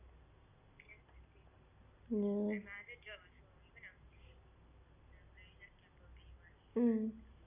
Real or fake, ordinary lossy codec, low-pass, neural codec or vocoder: real; none; 3.6 kHz; none